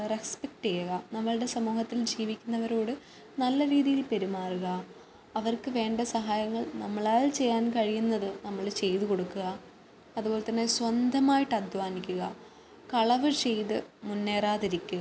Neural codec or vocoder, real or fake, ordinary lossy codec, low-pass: none; real; none; none